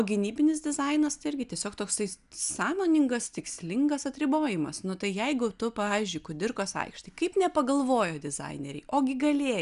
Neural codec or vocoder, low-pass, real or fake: none; 10.8 kHz; real